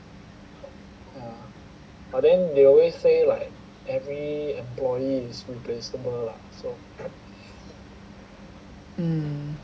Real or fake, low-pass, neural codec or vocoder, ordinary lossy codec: real; none; none; none